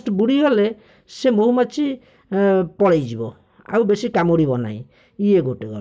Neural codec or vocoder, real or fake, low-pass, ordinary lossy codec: none; real; none; none